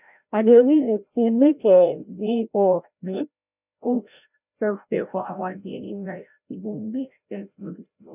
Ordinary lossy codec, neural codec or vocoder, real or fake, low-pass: none; codec, 16 kHz, 0.5 kbps, FreqCodec, larger model; fake; 3.6 kHz